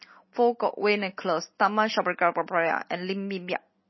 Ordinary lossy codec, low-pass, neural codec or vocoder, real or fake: MP3, 24 kbps; 7.2 kHz; none; real